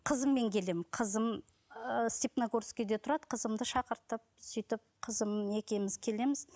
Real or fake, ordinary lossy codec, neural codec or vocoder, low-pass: real; none; none; none